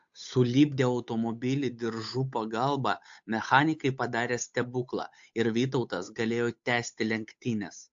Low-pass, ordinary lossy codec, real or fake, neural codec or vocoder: 7.2 kHz; AAC, 64 kbps; fake; codec, 16 kHz, 8 kbps, FunCodec, trained on Chinese and English, 25 frames a second